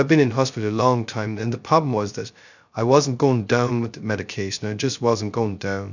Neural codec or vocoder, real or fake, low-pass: codec, 16 kHz, 0.2 kbps, FocalCodec; fake; 7.2 kHz